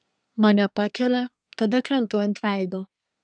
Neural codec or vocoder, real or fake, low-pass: codec, 24 kHz, 1 kbps, SNAC; fake; 9.9 kHz